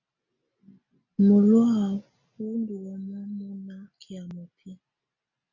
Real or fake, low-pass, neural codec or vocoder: real; 7.2 kHz; none